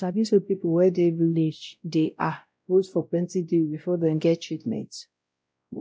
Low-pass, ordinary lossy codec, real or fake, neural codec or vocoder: none; none; fake; codec, 16 kHz, 0.5 kbps, X-Codec, WavLM features, trained on Multilingual LibriSpeech